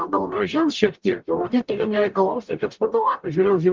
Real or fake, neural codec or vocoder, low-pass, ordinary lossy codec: fake; codec, 44.1 kHz, 0.9 kbps, DAC; 7.2 kHz; Opus, 24 kbps